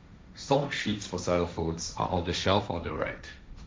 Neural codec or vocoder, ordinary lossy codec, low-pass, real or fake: codec, 16 kHz, 1.1 kbps, Voila-Tokenizer; none; none; fake